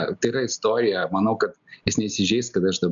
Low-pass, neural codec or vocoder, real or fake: 7.2 kHz; none; real